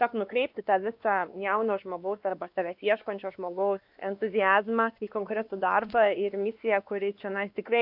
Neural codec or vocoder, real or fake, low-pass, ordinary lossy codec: codec, 16 kHz, 2 kbps, X-Codec, WavLM features, trained on Multilingual LibriSpeech; fake; 5.4 kHz; AAC, 48 kbps